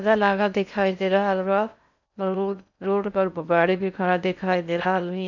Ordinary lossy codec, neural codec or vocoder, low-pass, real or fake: none; codec, 16 kHz in and 24 kHz out, 0.6 kbps, FocalCodec, streaming, 4096 codes; 7.2 kHz; fake